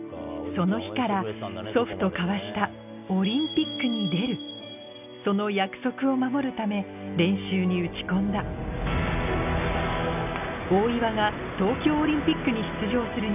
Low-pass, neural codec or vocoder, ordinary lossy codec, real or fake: 3.6 kHz; none; none; real